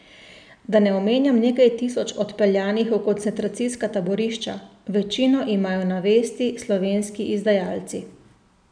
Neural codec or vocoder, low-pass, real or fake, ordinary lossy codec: none; 9.9 kHz; real; none